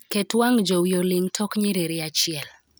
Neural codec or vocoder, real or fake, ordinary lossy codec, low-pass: none; real; none; none